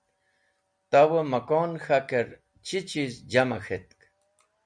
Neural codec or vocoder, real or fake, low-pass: none; real; 9.9 kHz